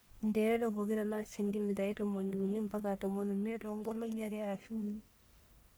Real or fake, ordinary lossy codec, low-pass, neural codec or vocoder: fake; none; none; codec, 44.1 kHz, 1.7 kbps, Pupu-Codec